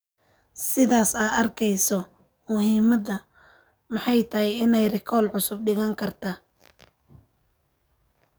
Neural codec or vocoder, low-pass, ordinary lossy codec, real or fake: codec, 44.1 kHz, 7.8 kbps, DAC; none; none; fake